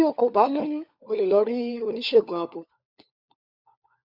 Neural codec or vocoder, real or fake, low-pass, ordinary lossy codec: codec, 16 kHz, 4 kbps, FunCodec, trained on LibriTTS, 50 frames a second; fake; 5.4 kHz; none